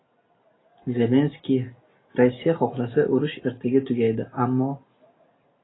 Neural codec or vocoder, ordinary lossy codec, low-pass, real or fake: none; AAC, 16 kbps; 7.2 kHz; real